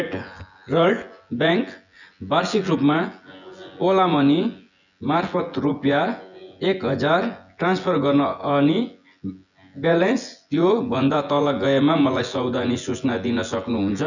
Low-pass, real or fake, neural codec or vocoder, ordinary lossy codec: 7.2 kHz; fake; vocoder, 24 kHz, 100 mel bands, Vocos; none